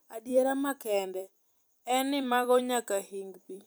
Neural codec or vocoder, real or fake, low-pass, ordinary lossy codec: vocoder, 44.1 kHz, 128 mel bands every 256 samples, BigVGAN v2; fake; none; none